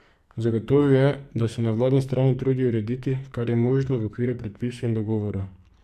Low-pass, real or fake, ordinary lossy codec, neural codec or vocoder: 14.4 kHz; fake; none; codec, 44.1 kHz, 2.6 kbps, SNAC